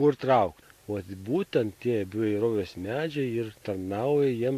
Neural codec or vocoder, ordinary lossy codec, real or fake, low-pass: none; AAC, 64 kbps; real; 14.4 kHz